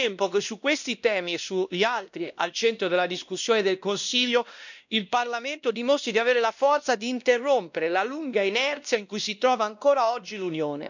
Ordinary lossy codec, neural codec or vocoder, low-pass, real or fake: none; codec, 16 kHz, 1 kbps, X-Codec, WavLM features, trained on Multilingual LibriSpeech; 7.2 kHz; fake